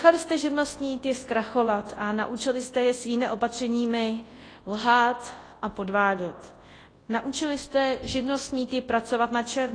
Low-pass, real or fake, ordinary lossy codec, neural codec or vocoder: 9.9 kHz; fake; AAC, 32 kbps; codec, 24 kHz, 0.9 kbps, WavTokenizer, large speech release